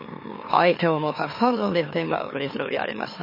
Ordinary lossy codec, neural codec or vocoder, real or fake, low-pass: MP3, 24 kbps; autoencoder, 44.1 kHz, a latent of 192 numbers a frame, MeloTTS; fake; 5.4 kHz